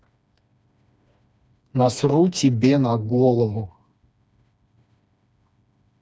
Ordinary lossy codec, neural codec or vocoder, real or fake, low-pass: none; codec, 16 kHz, 2 kbps, FreqCodec, smaller model; fake; none